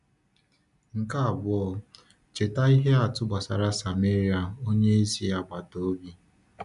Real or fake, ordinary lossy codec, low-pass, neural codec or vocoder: real; none; 10.8 kHz; none